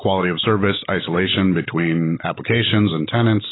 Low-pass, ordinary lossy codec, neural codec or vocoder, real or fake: 7.2 kHz; AAC, 16 kbps; none; real